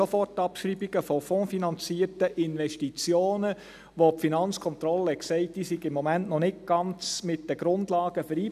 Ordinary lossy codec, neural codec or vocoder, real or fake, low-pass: none; none; real; 14.4 kHz